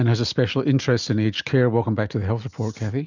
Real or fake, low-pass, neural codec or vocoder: real; 7.2 kHz; none